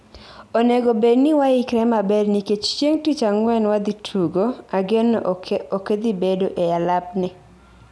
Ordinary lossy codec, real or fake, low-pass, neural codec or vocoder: none; real; none; none